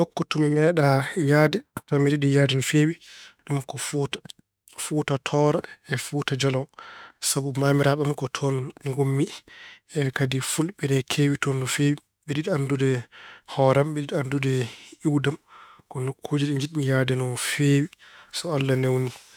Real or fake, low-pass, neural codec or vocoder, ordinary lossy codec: fake; none; autoencoder, 48 kHz, 32 numbers a frame, DAC-VAE, trained on Japanese speech; none